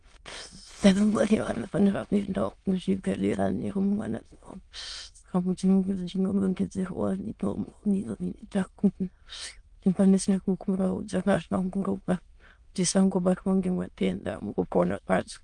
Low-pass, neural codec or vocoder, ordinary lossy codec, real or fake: 9.9 kHz; autoencoder, 22.05 kHz, a latent of 192 numbers a frame, VITS, trained on many speakers; Opus, 32 kbps; fake